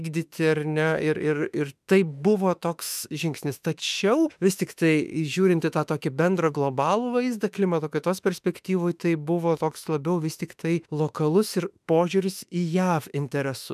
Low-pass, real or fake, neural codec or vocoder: 14.4 kHz; fake; autoencoder, 48 kHz, 32 numbers a frame, DAC-VAE, trained on Japanese speech